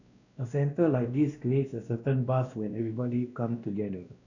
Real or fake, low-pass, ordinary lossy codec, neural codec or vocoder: fake; 7.2 kHz; none; codec, 16 kHz, 1 kbps, X-Codec, WavLM features, trained on Multilingual LibriSpeech